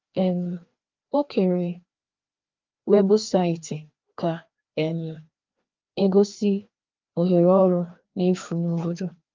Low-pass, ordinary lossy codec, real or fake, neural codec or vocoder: 7.2 kHz; Opus, 32 kbps; fake; codec, 16 kHz, 2 kbps, FreqCodec, larger model